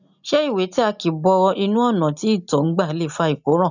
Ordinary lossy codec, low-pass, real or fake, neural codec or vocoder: none; 7.2 kHz; real; none